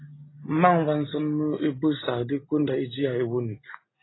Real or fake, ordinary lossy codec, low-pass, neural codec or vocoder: real; AAC, 16 kbps; 7.2 kHz; none